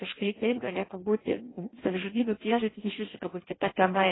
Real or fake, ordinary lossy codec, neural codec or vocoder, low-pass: fake; AAC, 16 kbps; codec, 16 kHz in and 24 kHz out, 0.6 kbps, FireRedTTS-2 codec; 7.2 kHz